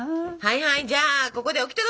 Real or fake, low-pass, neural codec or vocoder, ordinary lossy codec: real; none; none; none